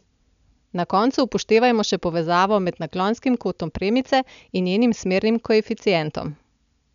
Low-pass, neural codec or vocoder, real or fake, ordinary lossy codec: 7.2 kHz; none; real; none